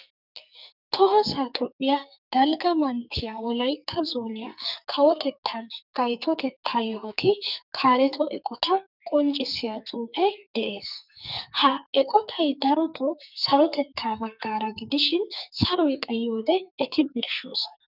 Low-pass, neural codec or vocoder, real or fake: 5.4 kHz; codec, 44.1 kHz, 2.6 kbps, SNAC; fake